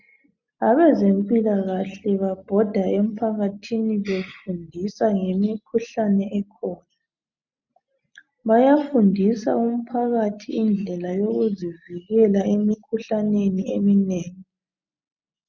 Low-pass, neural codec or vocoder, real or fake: 7.2 kHz; none; real